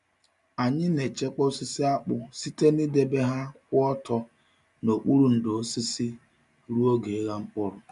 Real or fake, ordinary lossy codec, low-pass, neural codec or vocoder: real; MP3, 96 kbps; 10.8 kHz; none